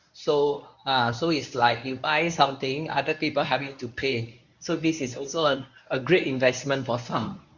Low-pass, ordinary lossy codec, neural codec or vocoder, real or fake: 7.2 kHz; Opus, 64 kbps; codec, 24 kHz, 0.9 kbps, WavTokenizer, medium speech release version 1; fake